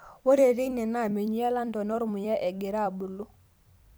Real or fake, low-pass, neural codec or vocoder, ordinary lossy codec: fake; none; vocoder, 44.1 kHz, 128 mel bands every 256 samples, BigVGAN v2; none